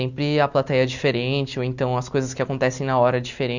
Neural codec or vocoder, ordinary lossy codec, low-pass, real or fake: none; none; 7.2 kHz; real